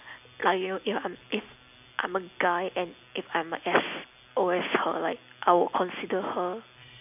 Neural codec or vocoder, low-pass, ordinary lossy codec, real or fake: none; 3.6 kHz; none; real